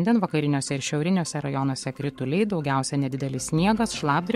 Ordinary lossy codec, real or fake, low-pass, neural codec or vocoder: MP3, 64 kbps; fake; 19.8 kHz; codec, 44.1 kHz, 7.8 kbps, Pupu-Codec